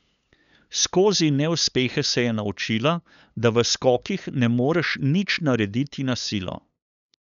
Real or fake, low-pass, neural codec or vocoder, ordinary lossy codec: fake; 7.2 kHz; codec, 16 kHz, 8 kbps, FunCodec, trained on LibriTTS, 25 frames a second; none